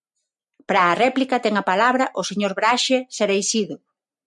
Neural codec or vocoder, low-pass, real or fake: none; 10.8 kHz; real